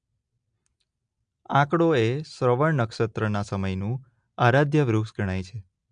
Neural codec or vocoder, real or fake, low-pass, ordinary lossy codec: none; real; 9.9 kHz; MP3, 64 kbps